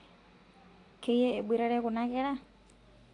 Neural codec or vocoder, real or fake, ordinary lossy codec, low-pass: none; real; AAC, 48 kbps; 10.8 kHz